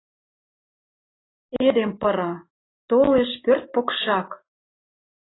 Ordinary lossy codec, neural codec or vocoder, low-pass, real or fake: AAC, 16 kbps; none; 7.2 kHz; real